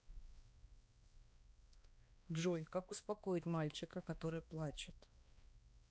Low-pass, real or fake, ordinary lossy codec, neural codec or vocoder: none; fake; none; codec, 16 kHz, 2 kbps, X-Codec, HuBERT features, trained on balanced general audio